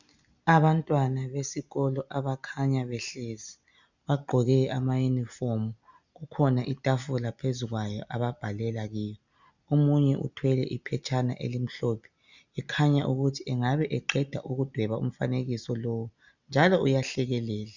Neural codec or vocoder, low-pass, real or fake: none; 7.2 kHz; real